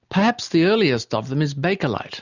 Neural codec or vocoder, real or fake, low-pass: none; real; 7.2 kHz